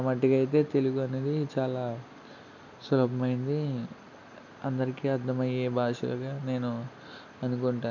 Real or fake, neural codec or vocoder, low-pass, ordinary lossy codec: real; none; 7.2 kHz; none